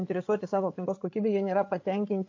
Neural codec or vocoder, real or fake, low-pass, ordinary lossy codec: codec, 16 kHz, 8 kbps, FreqCodec, larger model; fake; 7.2 kHz; AAC, 48 kbps